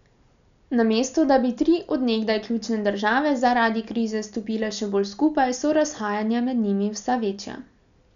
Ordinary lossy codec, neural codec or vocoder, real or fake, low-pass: none; none; real; 7.2 kHz